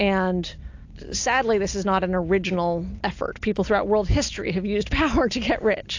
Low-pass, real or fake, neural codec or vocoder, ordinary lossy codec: 7.2 kHz; real; none; AAC, 48 kbps